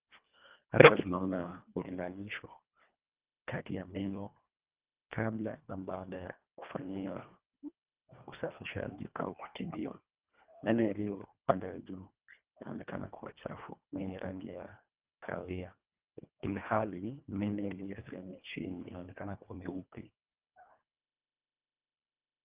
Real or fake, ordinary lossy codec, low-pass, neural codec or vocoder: fake; Opus, 24 kbps; 3.6 kHz; codec, 24 kHz, 1.5 kbps, HILCodec